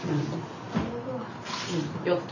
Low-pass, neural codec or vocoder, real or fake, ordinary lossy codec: 7.2 kHz; none; real; none